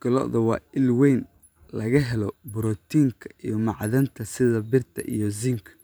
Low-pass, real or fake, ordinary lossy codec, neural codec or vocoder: none; fake; none; vocoder, 44.1 kHz, 128 mel bands every 512 samples, BigVGAN v2